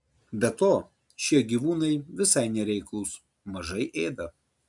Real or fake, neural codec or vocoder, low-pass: real; none; 10.8 kHz